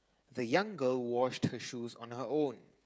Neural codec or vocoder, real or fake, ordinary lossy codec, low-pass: codec, 16 kHz, 16 kbps, FreqCodec, smaller model; fake; none; none